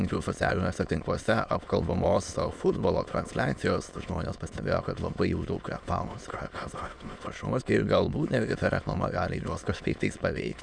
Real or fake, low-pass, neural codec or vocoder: fake; 9.9 kHz; autoencoder, 22.05 kHz, a latent of 192 numbers a frame, VITS, trained on many speakers